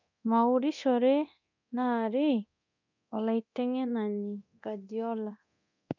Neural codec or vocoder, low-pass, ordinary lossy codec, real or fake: codec, 24 kHz, 0.9 kbps, DualCodec; 7.2 kHz; none; fake